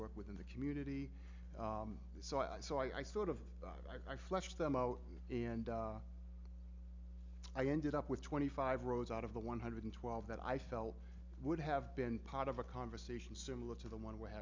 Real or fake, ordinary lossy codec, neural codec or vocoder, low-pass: real; MP3, 64 kbps; none; 7.2 kHz